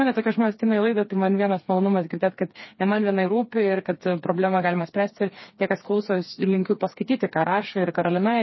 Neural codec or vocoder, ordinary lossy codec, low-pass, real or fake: codec, 16 kHz, 4 kbps, FreqCodec, smaller model; MP3, 24 kbps; 7.2 kHz; fake